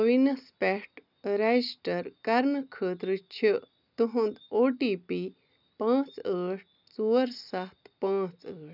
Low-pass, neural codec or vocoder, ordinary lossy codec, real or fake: 5.4 kHz; none; none; real